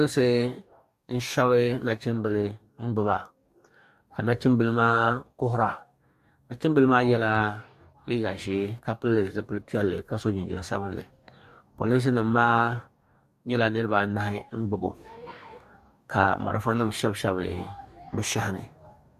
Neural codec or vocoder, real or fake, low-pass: codec, 44.1 kHz, 2.6 kbps, DAC; fake; 14.4 kHz